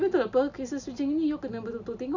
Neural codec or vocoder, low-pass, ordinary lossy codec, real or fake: none; 7.2 kHz; none; real